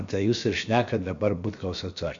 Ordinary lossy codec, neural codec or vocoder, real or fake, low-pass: MP3, 64 kbps; codec, 16 kHz, about 1 kbps, DyCAST, with the encoder's durations; fake; 7.2 kHz